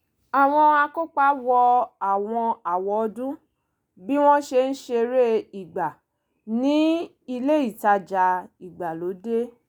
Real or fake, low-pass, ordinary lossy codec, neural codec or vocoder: real; none; none; none